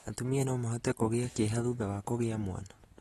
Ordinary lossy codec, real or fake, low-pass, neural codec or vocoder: AAC, 32 kbps; real; 19.8 kHz; none